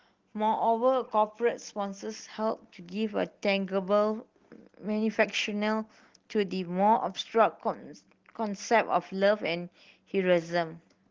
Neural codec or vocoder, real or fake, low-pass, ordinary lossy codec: none; real; 7.2 kHz; Opus, 16 kbps